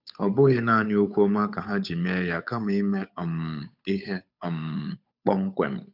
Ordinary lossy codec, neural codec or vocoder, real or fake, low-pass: none; codec, 16 kHz, 8 kbps, FunCodec, trained on Chinese and English, 25 frames a second; fake; 5.4 kHz